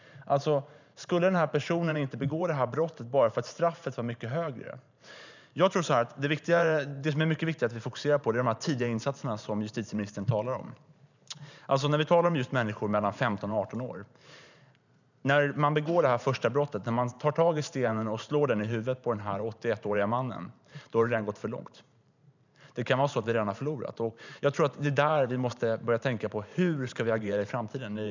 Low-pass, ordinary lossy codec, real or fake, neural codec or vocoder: 7.2 kHz; none; fake; vocoder, 44.1 kHz, 128 mel bands every 512 samples, BigVGAN v2